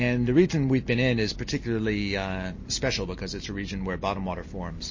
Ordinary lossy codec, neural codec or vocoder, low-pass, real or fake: MP3, 32 kbps; none; 7.2 kHz; real